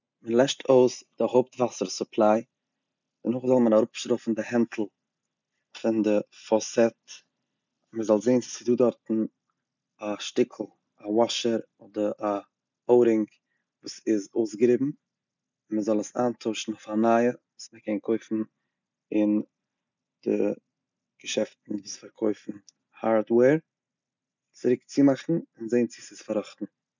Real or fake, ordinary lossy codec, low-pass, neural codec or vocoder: real; none; 7.2 kHz; none